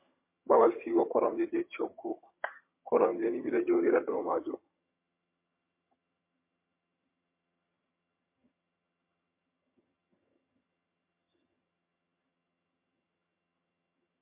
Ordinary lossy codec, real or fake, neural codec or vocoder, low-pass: MP3, 24 kbps; fake; vocoder, 22.05 kHz, 80 mel bands, HiFi-GAN; 3.6 kHz